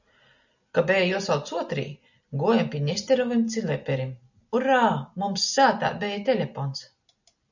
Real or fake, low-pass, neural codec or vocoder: real; 7.2 kHz; none